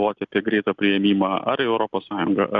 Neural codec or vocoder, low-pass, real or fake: none; 7.2 kHz; real